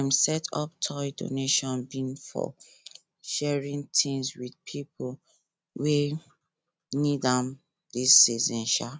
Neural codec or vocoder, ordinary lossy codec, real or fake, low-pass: none; none; real; none